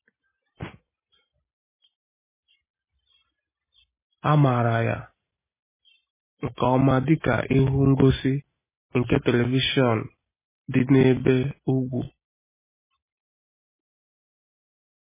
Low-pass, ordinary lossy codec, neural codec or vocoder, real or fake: 3.6 kHz; MP3, 16 kbps; none; real